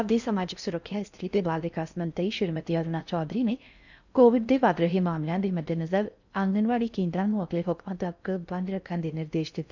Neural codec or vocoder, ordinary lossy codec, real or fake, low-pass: codec, 16 kHz in and 24 kHz out, 0.6 kbps, FocalCodec, streaming, 4096 codes; none; fake; 7.2 kHz